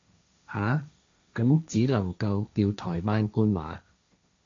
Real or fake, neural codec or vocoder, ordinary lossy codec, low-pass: fake; codec, 16 kHz, 1.1 kbps, Voila-Tokenizer; AAC, 48 kbps; 7.2 kHz